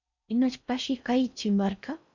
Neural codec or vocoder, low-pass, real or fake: codec, 16 kHz in and 24 kHz out, 0.6 kbps, FocalCodec, streaming, 4096 codes; 7.2 kHz; fake